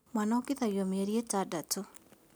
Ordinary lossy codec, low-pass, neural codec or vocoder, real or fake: none; none; none; real